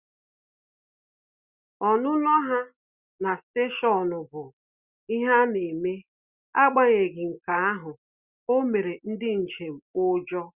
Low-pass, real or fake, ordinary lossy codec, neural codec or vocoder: 3.6 kHz; real; Opus, 64 kbps; none